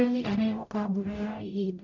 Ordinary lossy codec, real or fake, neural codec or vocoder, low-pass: none; fake; codec, 44.1 kHz, 0.9 kbps, DAC; 7.2 kHz